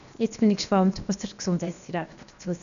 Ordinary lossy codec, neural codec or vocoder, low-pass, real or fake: none; codec, 16 kHz, 0.7 kbps, FocalCodec; 7.2 kHz; fake